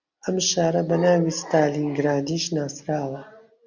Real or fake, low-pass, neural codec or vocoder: real; 7.2 kHz; none